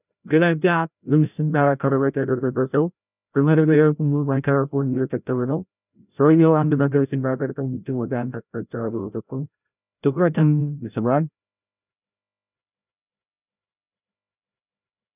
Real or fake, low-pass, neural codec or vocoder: fake; 3.6 kHz; codec, 16 kHz, 0.5 kbps, FreqCodec, larger model